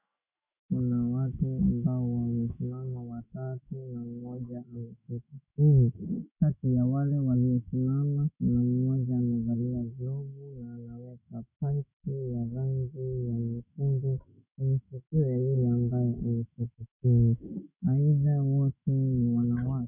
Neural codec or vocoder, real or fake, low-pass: autoencoder, 48 kHz, 128 numbers a frame, DAC-VAE, trained on Japanese speech; fake; 3.6 kHz